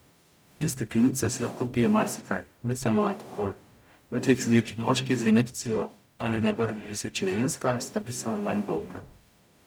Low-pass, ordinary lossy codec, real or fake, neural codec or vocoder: none; none; fake; codec, 44.1 kHz, 0.9 kbps, DAC